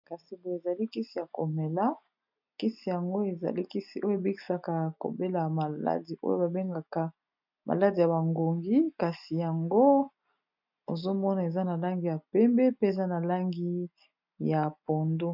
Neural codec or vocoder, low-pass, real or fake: none; 5.4 kHz; real